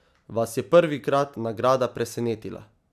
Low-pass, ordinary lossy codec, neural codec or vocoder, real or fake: 14.4 kHz; none; none; real